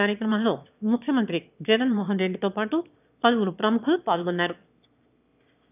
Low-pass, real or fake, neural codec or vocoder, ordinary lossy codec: 3.6 kHz; fake; autoencoder, 22.05 kHz, a latent of 192 numbers a frame, VITS, trained on one speaker; none